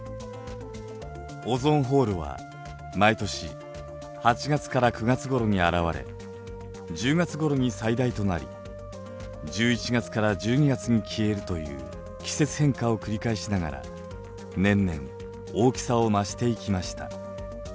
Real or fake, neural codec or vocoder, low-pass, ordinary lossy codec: real; none; none; none